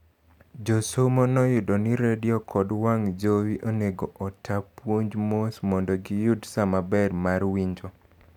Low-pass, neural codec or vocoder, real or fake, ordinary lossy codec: 19.8 kHz; none; real; none